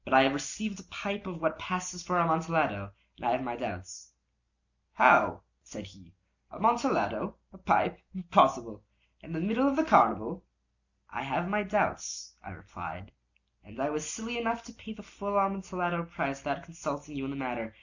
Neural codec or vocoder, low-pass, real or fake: none; 7.2 kHz; real